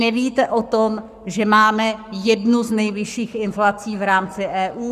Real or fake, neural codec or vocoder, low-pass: fake; codec, 44.1 kHz, 7.8 kbps, Pupu-Codec; 14.4 kHz